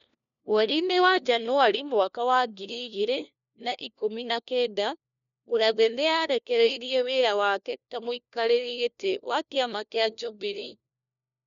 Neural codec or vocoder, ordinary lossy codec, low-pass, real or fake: codec, 16 kHz, 1 kbps, FunCodec, trained on LibriTTS, 50 frames a second; none; 7.2 kHz; fake